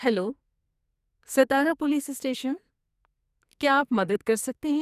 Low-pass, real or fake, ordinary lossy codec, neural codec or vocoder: 14.4 kHz; fake; none; codec, 44.1 kHz, 2.6 kbps, SNAC